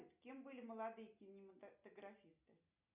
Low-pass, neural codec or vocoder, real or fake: 3.6 kHz; none; real